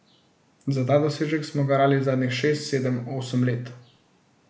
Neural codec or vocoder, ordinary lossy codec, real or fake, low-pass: none; none; real; none